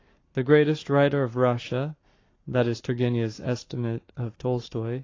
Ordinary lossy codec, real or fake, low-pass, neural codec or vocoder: AAC, 32 kbps; fake; 7.2 kHz; codec, 44.1 kHz, 7.8 kbps, Pupu-Codec